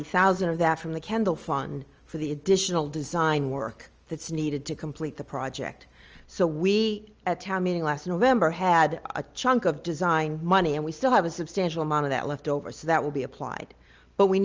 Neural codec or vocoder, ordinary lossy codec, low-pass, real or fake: none; Opus, 24 kbps; 7.2 kHz; real